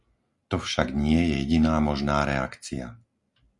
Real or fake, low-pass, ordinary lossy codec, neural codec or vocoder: real; 10.8 kHz; Opus, 64 kbps; none